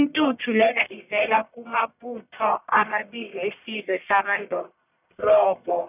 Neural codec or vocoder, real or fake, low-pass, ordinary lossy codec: codec, 44.1 kHz, 1.7 kbps, Pupu-Codec; fake; 3.6 kHz; none